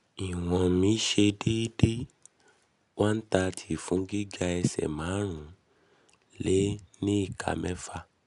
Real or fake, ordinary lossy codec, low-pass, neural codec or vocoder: real; none; 10.8 kHz; none